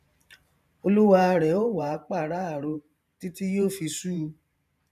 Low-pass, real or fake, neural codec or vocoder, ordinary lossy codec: 14.4 kHz; fake; vocoder, 44.1 kHz, 128 mel bands every 512 samples, BigVGAN v2; none